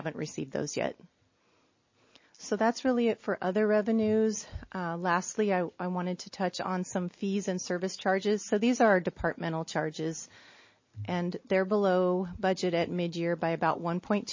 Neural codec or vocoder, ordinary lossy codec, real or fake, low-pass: none; MP3, 32 kbps; real; 7.2 kHz